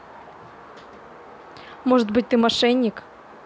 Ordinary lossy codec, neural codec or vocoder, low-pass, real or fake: none; none; none; real